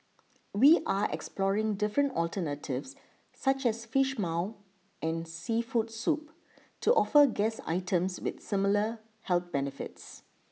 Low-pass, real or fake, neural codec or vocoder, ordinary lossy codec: none; real; none; none